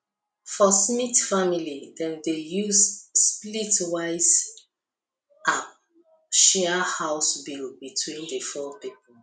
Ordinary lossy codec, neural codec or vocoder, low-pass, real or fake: none; none; 9.9 kHz; real